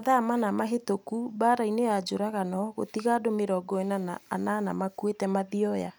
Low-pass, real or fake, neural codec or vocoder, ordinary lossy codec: none; real; none; none